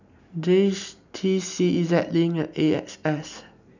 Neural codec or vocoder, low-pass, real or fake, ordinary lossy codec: none; 7.2 kHz; real; none